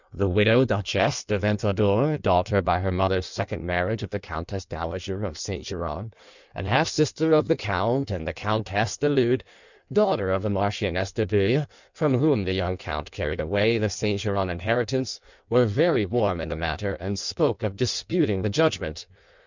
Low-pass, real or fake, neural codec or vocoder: 7.2 kHz; fake; codec, 16 kHz in and 24 kHz out, 1.1 kbps, FireRedTTS-2 codec